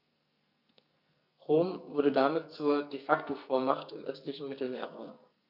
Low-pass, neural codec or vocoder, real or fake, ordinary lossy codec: 5.4 kHz; codec, 44.1 kHz, 2.6 kbps, SNAC; fake; none